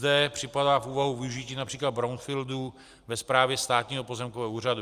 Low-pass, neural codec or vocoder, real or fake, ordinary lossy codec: 14.4 kHz; none; real; Opus, 32 kbps